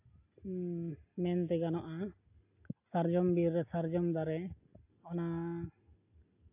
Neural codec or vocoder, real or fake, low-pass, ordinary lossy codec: none; real; 3.6 kHz; AAC, 32 kbps